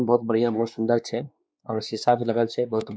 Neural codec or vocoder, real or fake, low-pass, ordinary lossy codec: codec, 16 kHz, 2 kbps, X-Codec, WavLM features, trained on Multilingual LibriSpeech; fake; none; none